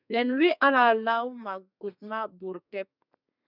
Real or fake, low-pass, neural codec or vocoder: fake; 5.4 kHz; codec, 32 kHz, 1.9 kbps, SNAC